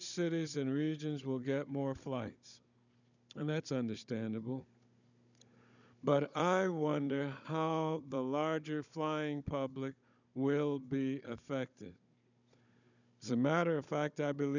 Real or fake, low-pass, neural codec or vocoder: fake; 7.2 kHz; codec, 16 kHz, 16 kbps, FunCodec, trained on Chinese and English, 50 frames a second